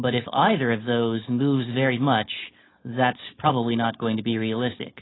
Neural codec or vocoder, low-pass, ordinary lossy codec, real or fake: codec, 16 kHz, 4.8 kbps, FACodec; 7.2 kHz; AAC, 16 kbps; fake